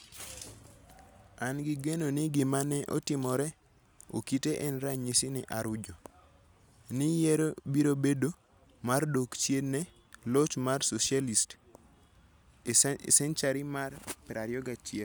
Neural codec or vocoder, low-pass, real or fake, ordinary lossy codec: none; none; real; none